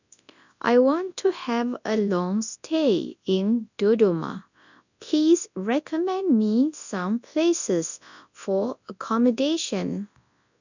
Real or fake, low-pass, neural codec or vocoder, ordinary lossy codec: fake; 7.2 kHz; codec, 24 kHz, 0.9 kbps, WavTokenizer, large speech release; none